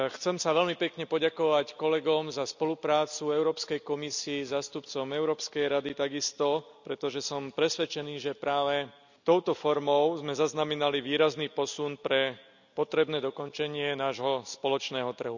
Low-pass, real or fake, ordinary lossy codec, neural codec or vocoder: 7.2 kHz; real; none; none